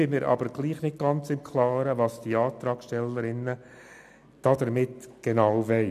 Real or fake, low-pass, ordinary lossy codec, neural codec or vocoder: real; 14.4 kHz; none; none